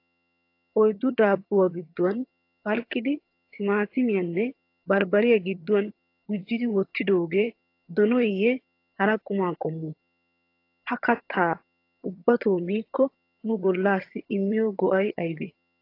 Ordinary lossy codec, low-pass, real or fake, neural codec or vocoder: AAC, 32 kbps; 5.4 kHz; fake; vocoder, 22.05 kHz, 80 mel bands, HiFi-GAN